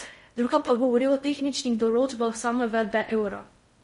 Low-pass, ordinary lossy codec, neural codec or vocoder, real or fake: 10.8 kHz; MP3, 48 kbps; codec, 16 kHz in and 24 kHz out, 0.6 kbps, FocalCodec, streaming, 2048 codes; fake